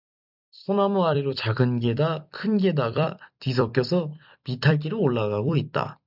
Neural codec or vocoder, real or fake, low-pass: vocoder, 44.1 kHz, 128 mel bands every 256 samples, BigVGAN v2; fake; 5.4 kHz